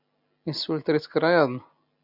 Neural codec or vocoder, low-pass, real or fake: none; 5.4 kHz; real